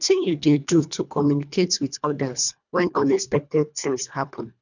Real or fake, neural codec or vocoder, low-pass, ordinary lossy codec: fake; codec, 24 kHz, 1.5 kbps, HILCodec; 7.2 kHz; none